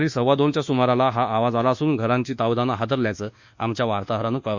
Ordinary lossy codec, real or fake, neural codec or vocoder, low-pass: Opus, 64 kbps; fake; codec, 24 kHz, 1.2 kbps, DualCodec; 7.2 kHz